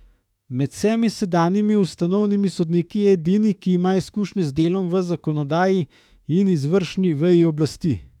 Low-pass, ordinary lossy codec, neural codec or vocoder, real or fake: 19.8 kHz; none; autoencoder, 48 kHz, 32 numbers a frame, DAC-VAE, trained on Japanese speech; fake